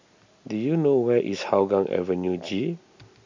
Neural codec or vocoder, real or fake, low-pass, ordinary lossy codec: none; real; 7.2 kHz; MP3, 64 kbps